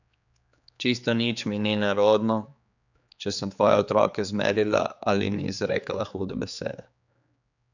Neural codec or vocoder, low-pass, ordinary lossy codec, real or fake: codec, 16 kHz, 4 kbps, X-Codec, HuBERT features, trained on general audio; 7.2 kHz; none; fake